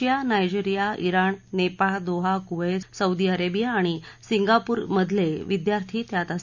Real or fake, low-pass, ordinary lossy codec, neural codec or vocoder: real; 7.2 kHz; none; none